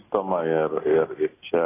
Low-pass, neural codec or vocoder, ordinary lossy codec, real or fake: 3.6 kHz; none; AAC, 16 kbps; real